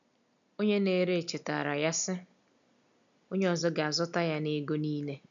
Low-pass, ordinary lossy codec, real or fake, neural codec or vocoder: 7.2 kHz; none; real; none